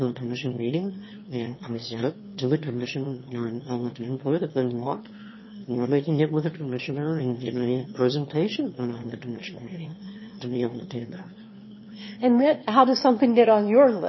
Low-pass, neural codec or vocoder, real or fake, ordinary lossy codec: 7.2 kHz; autoencoder, 22.05 kHz, a latent of 192 numbers a frame, VITS, trained on one speaker; fake; MP3, 24 kbps